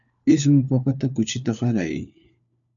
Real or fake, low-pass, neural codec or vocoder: fake; 7.2 kHz; codec, 16 kHz, 4 kbps, FunCodec, trained on LibriTTS, 50 frames a second